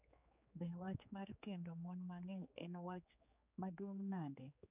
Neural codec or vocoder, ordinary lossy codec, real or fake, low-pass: codec, 16 kHz, 4 kbps, X-Codec, HuBERT features, trained on general audio; none; fake; 3.6 kHz